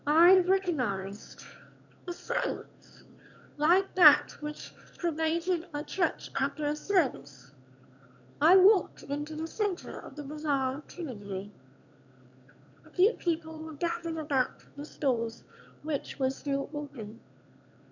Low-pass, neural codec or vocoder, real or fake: 7.2 kHz; autoencoder, 22.05 kHz, a latent of 192 numbers a frame, VITS, trained on one speaker; fake